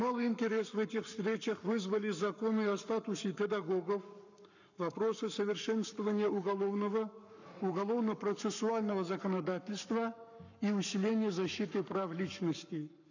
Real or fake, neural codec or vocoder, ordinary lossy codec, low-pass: fake; codec, 44.1 kHz, 7.8 kbps, Pupu-Codec; none; 7.2 kHz